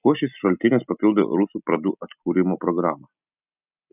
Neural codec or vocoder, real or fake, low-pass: none; real; 3.6 kHz